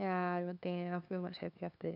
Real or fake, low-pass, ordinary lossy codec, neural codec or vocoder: fake; 5.4 kHz; none; codec, 16 kHz, 2 kbps, FunCodec, trained on LibriTTS, 25 frames a second